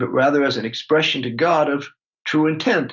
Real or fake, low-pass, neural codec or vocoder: real; 7.2 kHz; none